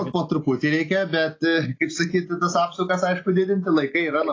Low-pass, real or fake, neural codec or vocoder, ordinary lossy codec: 7.2 kHz; real; none; AAC, 32 kbps